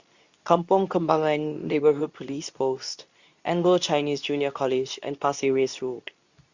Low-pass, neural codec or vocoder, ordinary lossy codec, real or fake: 7.2 kHz; codec, 24 kHz, 0.9 kbps, WavTokenizer, medium speech release version 2; Opus, 64 kbps; fake